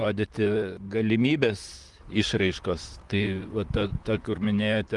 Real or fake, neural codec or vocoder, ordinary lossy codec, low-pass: fake; vocoder, 44.1 kHz, 128 mel bands, Pupu-Vocoder; Opus, 24 kbps; 10.8 kHz